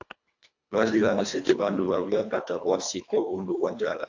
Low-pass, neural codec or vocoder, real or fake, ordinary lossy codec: 7.2 kHz; codec, 24 kHz, 1.5 kbps, HILCodec; fake; AAC, 48 kbps